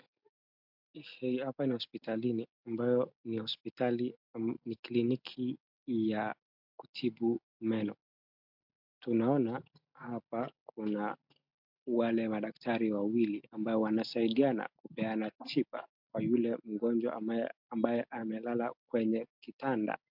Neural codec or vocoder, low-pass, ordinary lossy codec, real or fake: none; 5.4 kHz; MP3, 48 kbps; real